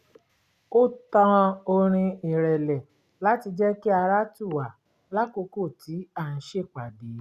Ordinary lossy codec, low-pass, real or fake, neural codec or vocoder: Opus, 64 kbps; 14.4 kHz; real; none